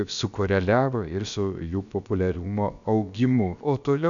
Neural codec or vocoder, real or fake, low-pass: codec, 16 kHz, about 1 kbps, DyCAST, with the encoder's durations; fake; 7.2 kHz